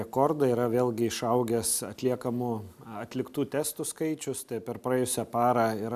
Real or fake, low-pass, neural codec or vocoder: real; 14.4 kHz; none